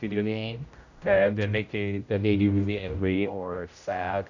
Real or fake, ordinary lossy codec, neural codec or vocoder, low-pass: fake; none; codec, 16 kHz, 0.5 kbps, X-Codec, HuBERT features, trained on general audio; 7.2 kHz